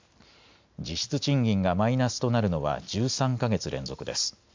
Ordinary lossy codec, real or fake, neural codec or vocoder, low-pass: MP3, 64 kbps; real; none; 7.2 kHz